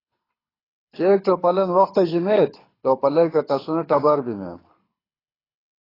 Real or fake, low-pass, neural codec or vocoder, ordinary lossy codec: fake; 5.4 kHz; codec, 24 kHz, 6 kbps, HILCodec; AAC, 24 kbps